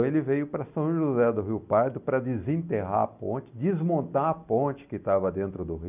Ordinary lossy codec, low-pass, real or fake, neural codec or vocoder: none; 3.6 kHz; real; none